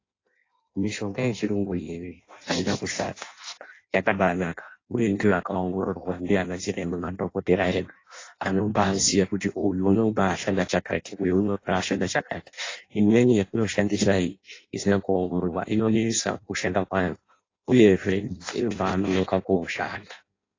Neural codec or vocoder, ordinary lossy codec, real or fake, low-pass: codec, 16 kHz in and 24 kHz out, 0.6 kbps, FireRedTTS-2 codec; AAC, 32 kbps; fake; 7.2 kHz